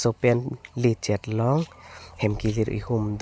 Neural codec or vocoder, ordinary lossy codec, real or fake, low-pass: none; none; real; none